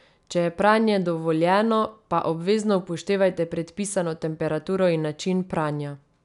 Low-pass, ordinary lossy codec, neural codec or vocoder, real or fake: 10.8 kHz; none; none; real